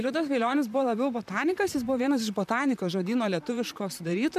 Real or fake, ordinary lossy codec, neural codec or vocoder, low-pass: fake; MP3, 96 kbps; vocoder, 44.1 kHz, 128 mel bands, Pupu-Vocoder; 14.4 kHz